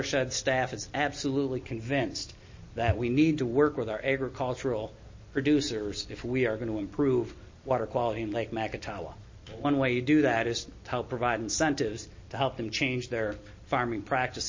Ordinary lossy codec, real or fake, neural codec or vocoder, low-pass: MP3, 32 kbps; real; none; 7.2 kHz